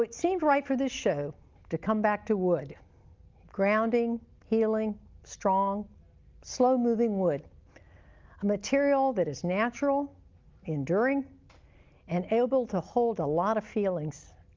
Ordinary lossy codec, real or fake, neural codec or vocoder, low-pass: Opus, 32 kbps; real; none; 7.2 kHz